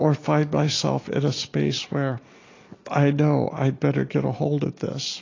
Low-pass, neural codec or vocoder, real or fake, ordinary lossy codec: 7.2 kHz; none; real; AAC, 32 kbps